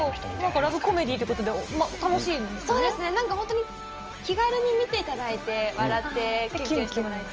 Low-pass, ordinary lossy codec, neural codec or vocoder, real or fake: 7.2 kHz; Opus, 24 kbps; none; real